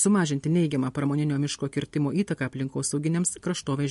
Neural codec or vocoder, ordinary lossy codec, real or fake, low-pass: none; MP3, 48 kbps; real; 14.4 kHz